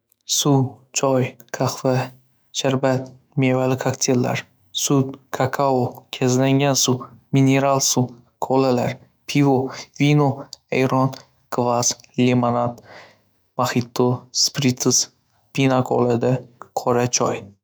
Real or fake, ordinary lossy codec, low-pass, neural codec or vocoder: fake; none; none; autoencoder, 48 kHz, 128 numbers a frame, DAC-VAE, trained on Japanese speech